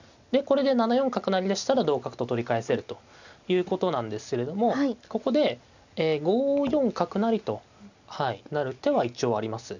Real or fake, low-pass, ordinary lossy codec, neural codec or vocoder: real; 7.2 kHz; none; none